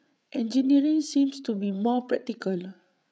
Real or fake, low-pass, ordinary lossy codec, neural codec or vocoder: fake; none; none; codec, 16 kHz, 8 kbps, FreqCodec, larger model